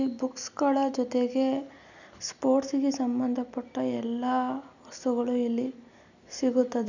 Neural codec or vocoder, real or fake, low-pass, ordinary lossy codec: none; real; 7.2 kHz; none